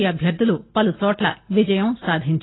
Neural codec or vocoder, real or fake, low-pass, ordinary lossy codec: none; real; 7.2 kHz; AAC, 16 kbps